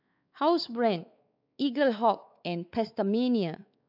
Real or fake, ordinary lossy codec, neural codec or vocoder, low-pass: fake; AAC, 48 kbps; codec, 16 kHz, 4 kbps, X-Codec, WavLM features, trained on Multilingual LibriSpeech; 5.4 kHz